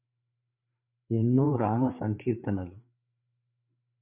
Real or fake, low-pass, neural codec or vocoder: fake; 3.6 kHz; codec, 16 kHz, 4 kbps, FreqCodec, larger model